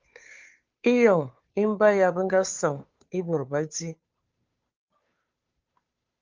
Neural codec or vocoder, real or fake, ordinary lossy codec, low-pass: codec, 16 kHz, 2 kbps, FunCodec, trained on Chinese and English, 25 frames a second; fake; Opus, 24 kbps; 7.2 kHz